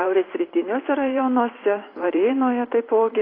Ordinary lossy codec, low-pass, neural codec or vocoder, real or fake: AAC, 24 kbps; 5.4 kHz; vocoder, 44.1 kHz, 128 mel bands every 512 samples, BigVGAN v2; fake